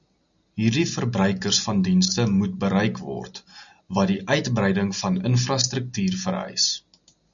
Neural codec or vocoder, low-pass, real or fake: none; 7.2 kHz; real